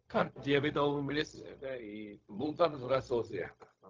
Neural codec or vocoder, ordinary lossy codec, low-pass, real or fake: codec, 16 kHz, 0.4 kbps, LongCat-Audio-Codec; Opus, 24 kbps; 7.2 kHz; fake